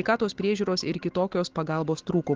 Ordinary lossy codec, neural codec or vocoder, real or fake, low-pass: Opus, 16 kbps; none; real; 7.2 kHz